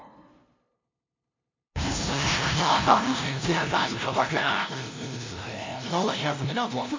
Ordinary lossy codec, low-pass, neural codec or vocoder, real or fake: MP3, 48 kbps; 7.2 kHz; codec, 16 kHz, 0.5 kbps, FunCodec, trained on LibriTTS, 25 frames a second; fake